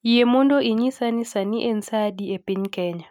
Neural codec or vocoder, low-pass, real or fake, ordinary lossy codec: none; 19.8 kHz; real; none